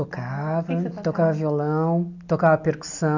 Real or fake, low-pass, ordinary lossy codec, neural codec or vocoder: real; 7.2 kHz; none; none